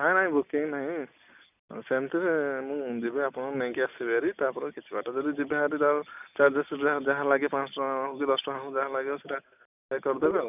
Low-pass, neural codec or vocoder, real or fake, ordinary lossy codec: 3.6 kHz; none; real; none